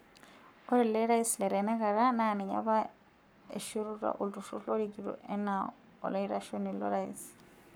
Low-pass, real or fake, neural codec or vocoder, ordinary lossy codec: none; fake; codec, 44.1 kHz, 7.8 kbps, Pupu-Codec; none